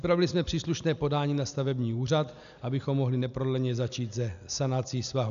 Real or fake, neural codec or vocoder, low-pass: real; none; 7.2 kHz